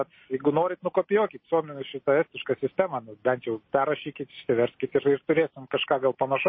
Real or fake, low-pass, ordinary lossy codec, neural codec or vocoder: real; 7.2 kHz; MP3, 24 kbps; none